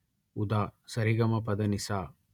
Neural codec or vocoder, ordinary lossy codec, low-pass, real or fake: vocoder, 48 kHz, 128 mel bands, Vocos; none; 19.8 kHz; fake